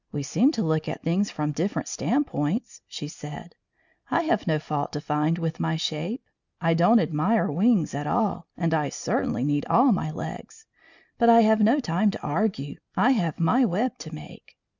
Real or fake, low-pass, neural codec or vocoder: real; 7.2 kHz; none